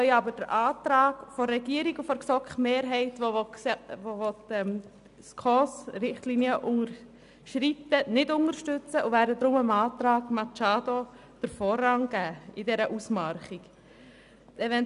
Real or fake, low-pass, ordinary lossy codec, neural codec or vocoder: real; 10.8 kHz; none; none